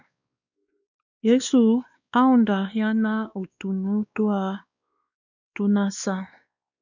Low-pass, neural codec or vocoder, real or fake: 7.2 kHz; codec, 16 kHz, 2 kbps, X-Codec, WavLM features, trained on Multilingual LibriSpeech; fake